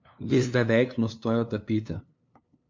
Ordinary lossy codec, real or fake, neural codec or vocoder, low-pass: MP3, 48 kbps; fake; codec, 16 kHz, 2 kbps, FunCodec, trained on LibriTTS, 25 frames a second; 7.2 kHz